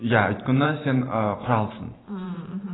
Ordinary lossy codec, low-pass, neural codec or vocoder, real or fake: AAC, 16 kbps; 7.2 kHz; vocoder, 44.1 kHz, 128 mel bands every 512 samples, BigVGAN v2; fake